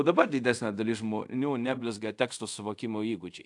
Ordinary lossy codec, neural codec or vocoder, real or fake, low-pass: AAC, 64 kbps; codec, 24 kHz, 0.5 kbps, DualCodec; fake; 10.8 kHz